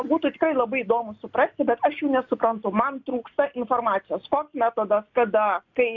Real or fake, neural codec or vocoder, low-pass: real; none; 7.2 kHz